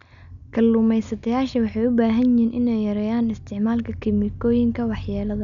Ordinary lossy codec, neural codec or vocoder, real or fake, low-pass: none; none; real; 7.2 kHz